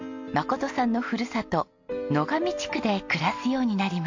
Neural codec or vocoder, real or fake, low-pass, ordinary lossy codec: none; real; 7.2 kHz; MP3, 48 kbps